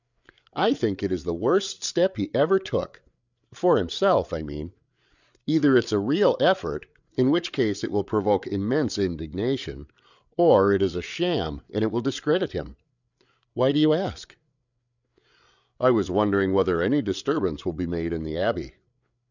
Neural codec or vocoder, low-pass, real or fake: codec, 16 kHz, 8 kbps, FreqCodec, larger model; 7.2 kHz; fake